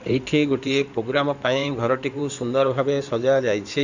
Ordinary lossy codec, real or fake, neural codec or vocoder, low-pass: none; fake; codec, 16 kHz in and 24 kHz out, 2.2 kbps, FireRedTTS-2 codec; 7.2 kHz